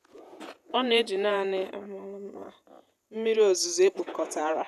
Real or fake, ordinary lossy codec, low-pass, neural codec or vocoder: fake; none; 14.4 kHz; vocoder, 48 kHz, 128 mel bands, Vocos